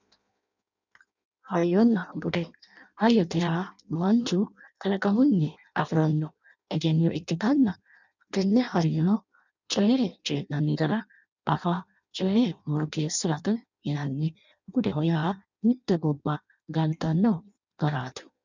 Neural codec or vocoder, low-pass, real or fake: codec, 16 kHz in and 24 kHz out, 0.6 kbps, FireRedTTS-2 codec; 7.2 kHz; fake